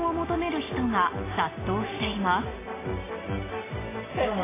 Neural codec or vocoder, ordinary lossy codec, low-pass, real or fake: none; AAC, 16 kbps; 3.6 kHz; real